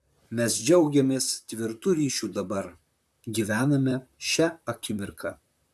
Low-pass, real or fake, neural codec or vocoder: 14.4 kHz; fake; vocoder, 44.1 kHz, 128 mel bands, Pupu-Vocoder